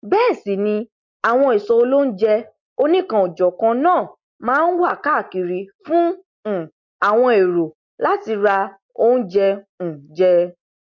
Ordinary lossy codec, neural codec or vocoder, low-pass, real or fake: MP3, 48 kbps; none; 7.2 kHz; real